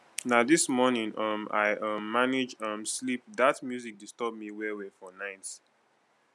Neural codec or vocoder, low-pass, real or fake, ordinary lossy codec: none; none; real; none